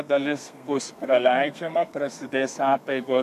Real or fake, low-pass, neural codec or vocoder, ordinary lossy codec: fake; 14.4 kHz; codec, 32 kHz, 1.9 kbps, SNAC; MP3, 96 kbps